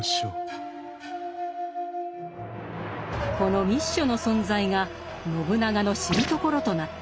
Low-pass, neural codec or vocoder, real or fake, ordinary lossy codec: none; none; real; none